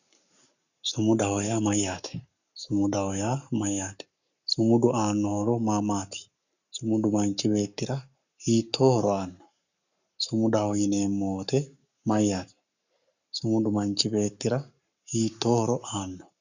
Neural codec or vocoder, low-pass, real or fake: codec, 44.1 kHz, 7.8 kbps, Pupu-Codec; 7.2 kHz; fake